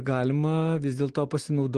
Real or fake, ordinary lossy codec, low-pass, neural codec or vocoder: real; Opus, 16 kbps; 9.9 kHz; none